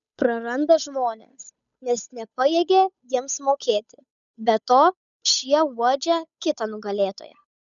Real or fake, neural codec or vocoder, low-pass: fake; codec, 16 kHz, 8 kbps, FunCodec, trained on Chinese and English, 25 frames a second; 7.2 kHz